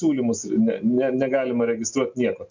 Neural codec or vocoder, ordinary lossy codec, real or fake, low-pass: none; MP3, 64 kbps; real; 7.2 kHz